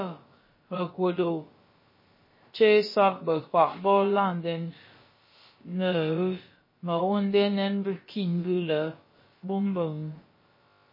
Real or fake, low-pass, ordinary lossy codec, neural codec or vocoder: fake; 5.4 kHz; MP3, 24 kbps; codec, 16 kHz, about 1 kbps, DyCAST, with the encoder's durations